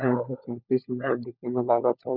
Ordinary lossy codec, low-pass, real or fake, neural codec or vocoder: none; 5.4 kHz; fake; codec, 16 kHz, 16 kbps, FunCodec, trained on LibriTTS, 50 frames a second